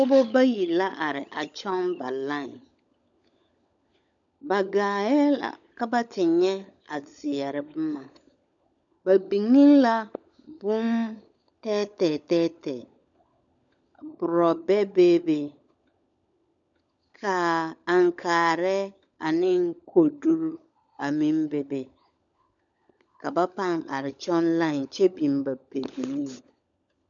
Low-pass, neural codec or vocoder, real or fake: 7.2 kHz; codec, 16 kHz, 16 kbps, FunCodec, trained on LibriTTS, 50 frames a second; fake